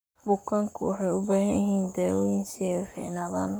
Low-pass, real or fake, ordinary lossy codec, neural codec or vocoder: none; fake; none; codec, 44.1 kHz, 7.8 kbps, Pupu-Codec